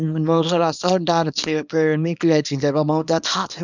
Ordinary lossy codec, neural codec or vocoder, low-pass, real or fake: none; codec, 24 kHz, 0.9 kbps, WavTokenizer, small release; 7.2 kHz; fake